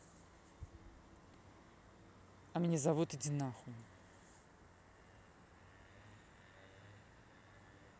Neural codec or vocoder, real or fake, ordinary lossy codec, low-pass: none; real; none; none